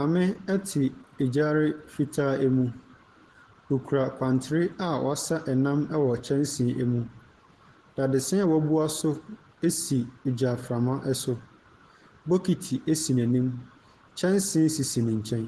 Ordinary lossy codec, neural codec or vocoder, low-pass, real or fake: Opus, 16 kbps; none; 10.8 kHz; real